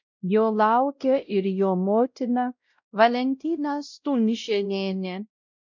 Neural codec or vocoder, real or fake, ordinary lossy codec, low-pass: codec, 16 kHz, 0.5 kbps, X-Codec, WavLM features, trained on Multilingual LibriSpeech; fake; MP3, 64 kbps; 7.2 kHz